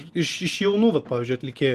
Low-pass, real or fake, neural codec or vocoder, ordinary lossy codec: 14.4 kHz; real; none; Opus, 24 kbps